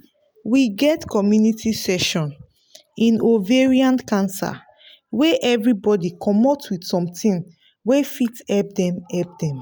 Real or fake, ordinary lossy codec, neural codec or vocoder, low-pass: real; none; none; none